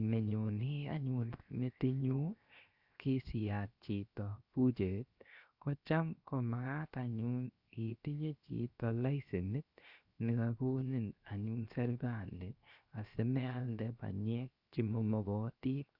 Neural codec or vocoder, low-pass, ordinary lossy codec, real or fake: codec, 16 kHz, 0.7 kbps, FocalCodec; 5.4 kHz; Opus, 64 kbps; fake